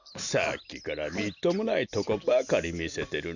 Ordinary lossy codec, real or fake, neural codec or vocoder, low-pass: none; real; none; 7.2 kHz